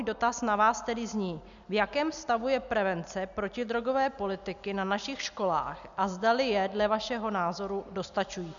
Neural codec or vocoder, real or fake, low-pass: none; real; 7.2 kHz